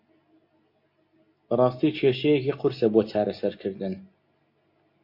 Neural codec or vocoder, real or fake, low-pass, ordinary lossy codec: none; real; 5.4 kHz; MP3, 32 kbps